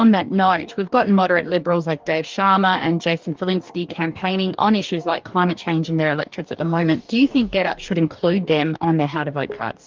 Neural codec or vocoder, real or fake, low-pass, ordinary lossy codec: codec, 44.1 kHz, 2.6 kbps, DAC; fake; 7.2 kHz; Opus, 32 kbps